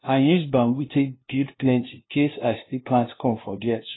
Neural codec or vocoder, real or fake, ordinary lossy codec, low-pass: codec, 16 kHz, 0.5 kbps, FunCodec, trained on LibriTTS, 25 frames a second; fake; AAC, 16 kbps; 7.2 kHz